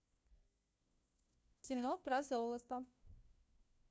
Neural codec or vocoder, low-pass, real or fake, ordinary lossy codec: codec, 16 kHz, 1 kbps, FunCodec, trained on LibriTTS, 50 frames a second; none; fake; none